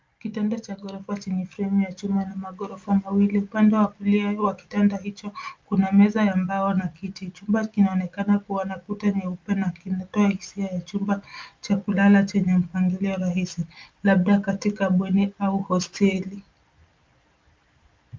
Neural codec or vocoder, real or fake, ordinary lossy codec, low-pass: none; real; Opus, 24 kbps; 7.2 kHz